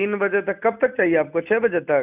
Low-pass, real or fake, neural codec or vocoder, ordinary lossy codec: 3.6 kHz; real; none; none